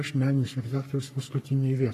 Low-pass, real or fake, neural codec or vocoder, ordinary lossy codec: 14.4 kHz; fake; codec, 44.1 kHz, 3.4 kbps, Pupu-Codec; AAC, 48 kbps